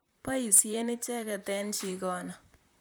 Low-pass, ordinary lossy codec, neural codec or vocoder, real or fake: none; none; vocoder, 44.1 kHz, 128 mel bands, Pupu-Vocoder; fake